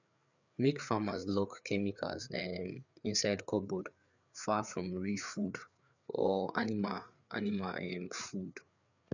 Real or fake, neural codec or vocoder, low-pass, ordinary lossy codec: fake; codec, 16 kHz, 4 kbps, FreqCodec, larger model; 7.2 kHz; none